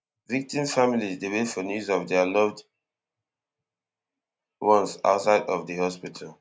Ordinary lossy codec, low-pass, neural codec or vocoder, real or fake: none; none; none; real